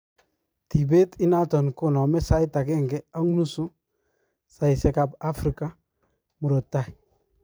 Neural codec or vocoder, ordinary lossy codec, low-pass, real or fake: vocoder, 44.1 kHz, 128 mel bands every 256 samples, BigVGAN v2; none; none; fake